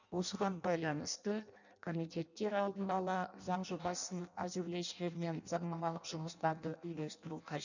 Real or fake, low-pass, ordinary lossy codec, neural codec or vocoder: fake; 7.2 kHz; none; codec, 16 kHz in and 24 kHz out, 0.6 kbps, FireRedTTS-2 codec